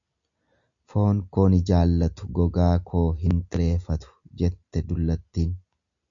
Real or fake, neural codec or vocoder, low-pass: real; none; 7.2 kHz